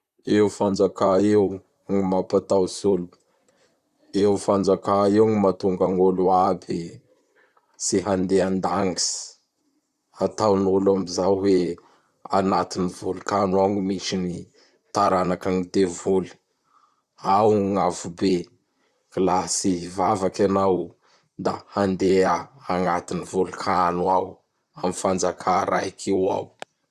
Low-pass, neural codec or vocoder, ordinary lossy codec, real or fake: 14.4 kHz; vocoder, 44.1 kHz, 128 mel bands, Pupu-Vocoder; Opus, 64 kbps; fake